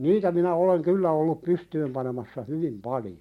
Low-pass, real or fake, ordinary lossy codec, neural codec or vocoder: 19.8 kHz; real; MP3, 64 kbps; none